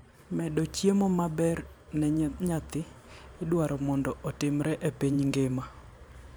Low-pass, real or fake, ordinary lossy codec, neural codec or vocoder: none; fake; none; vocoder, 44.1 kHz, 128 mel bands every 512 samples, BigVGAN v2